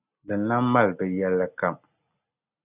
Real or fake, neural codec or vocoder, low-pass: real; none; 3.6 kHz